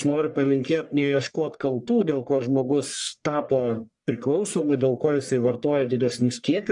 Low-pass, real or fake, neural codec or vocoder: 10.8 kHz; fake; codec, 44.1 kHz, 1.7 kbps, Pupu-Codec